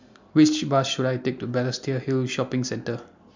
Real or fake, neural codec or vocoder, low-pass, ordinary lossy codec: real; none; 7.2 kHz; MP3, 64 kbps